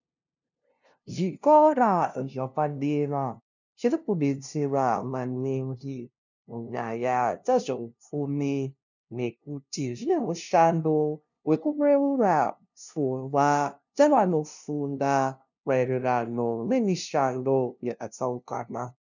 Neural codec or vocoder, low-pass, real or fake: codec, 16 kHz, 0.5 kbps, FunCodec, trained on LibriTTS, 25 frames a second; 7.2 kHz; fake